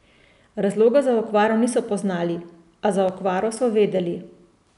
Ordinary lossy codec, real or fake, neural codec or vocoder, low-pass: none; real; none; 10.8 kHz